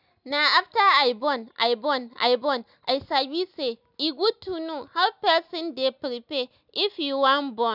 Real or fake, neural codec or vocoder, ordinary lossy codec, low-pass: real; none; none; 5.4 kHz